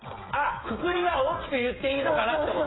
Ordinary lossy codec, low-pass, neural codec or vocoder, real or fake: AAC, 16 kbps; 7.2 kHz; codec, 16 kHz, 8 kbps, FreqCodec, smaller model; fake